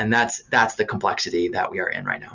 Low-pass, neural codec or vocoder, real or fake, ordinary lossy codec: 7.2 kHz; none; real; Opus, 64 kbps